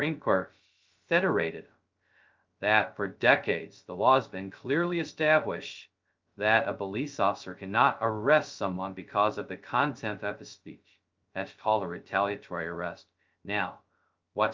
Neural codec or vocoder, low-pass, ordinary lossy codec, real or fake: codec, 16 kHz, 0.2 kbps, FocalCodec; 7.2 kHz; Opus, 24 kbps; fake